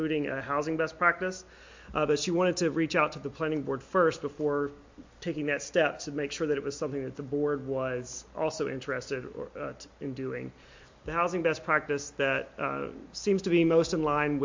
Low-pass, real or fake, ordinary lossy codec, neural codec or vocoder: 7.2 kHz; real; MP3, 48 kbps; none